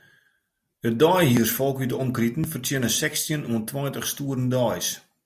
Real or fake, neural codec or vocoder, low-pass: real; none; 14.4 kHz